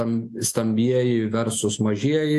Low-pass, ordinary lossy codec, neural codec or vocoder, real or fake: 14.4 kHz; AAC, 64 kbps; autoencoder, 48 kHz, 128 numbers a frame, DAC-VAE, trained on Japanese speech; fake